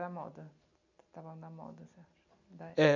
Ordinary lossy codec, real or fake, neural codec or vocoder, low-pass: none; real; none; 7.2 kHz